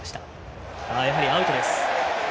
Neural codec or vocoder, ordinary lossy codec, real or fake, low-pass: none; none; real; none